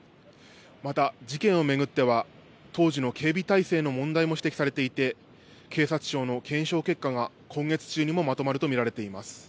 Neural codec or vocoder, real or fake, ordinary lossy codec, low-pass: none; real; none; none